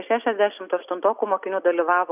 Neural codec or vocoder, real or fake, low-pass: none; real; 3.6 kHz